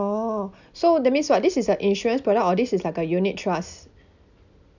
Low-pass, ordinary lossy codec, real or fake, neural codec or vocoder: 7.2 kHz; none; real; none